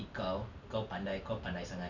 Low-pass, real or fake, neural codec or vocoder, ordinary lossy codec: 7.2 kHz; real; none; none